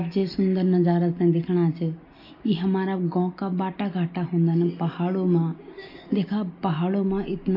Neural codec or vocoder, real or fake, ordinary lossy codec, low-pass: none; real; AAC, 32 kbps; 5.4 kHz